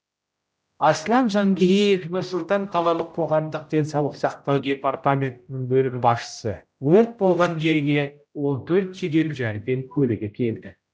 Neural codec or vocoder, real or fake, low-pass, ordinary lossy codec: codec, 16 kHz, 0.5 kbps, X-Codec, HuBERT features, trained on general audio; fake; none; none